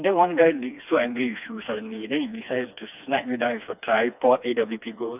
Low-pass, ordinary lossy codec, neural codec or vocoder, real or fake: 3.6 kHz; none; codec, 16 kHz, 2 kbps, FreqCodec, smaller model; fake